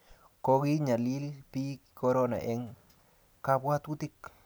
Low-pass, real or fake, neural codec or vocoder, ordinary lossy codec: none; real; none; none